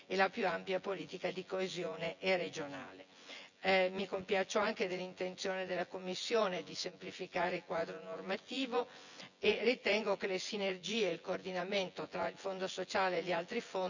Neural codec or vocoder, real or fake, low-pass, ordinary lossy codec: vocoder, 24 kHz, 100 mel bands, Vocos; fake; 7.2 kHz; none